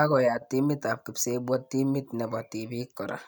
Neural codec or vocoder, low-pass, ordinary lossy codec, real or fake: none; none; none; real